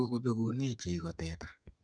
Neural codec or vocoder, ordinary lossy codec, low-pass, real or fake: codec, 32 kHz, 1.9 kbps, SNAC; none; 9.9 kHz; fake